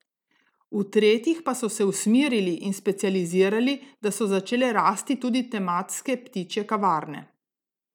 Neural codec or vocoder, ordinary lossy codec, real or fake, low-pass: none; none; real; 19.8 kHz